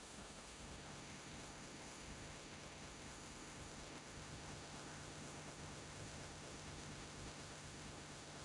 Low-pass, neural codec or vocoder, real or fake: 10.8 kHz; codec, 16 kHz in and 24 kHz out, 0.9 kbps, LongCat-Audio-Codec, four codebook decoder; fake